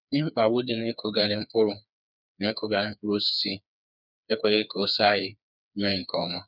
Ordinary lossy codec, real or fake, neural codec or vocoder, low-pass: none; fake; codec, 16 kHz, 4 kbps, FreqCodec, smaller model; 5.4 kHz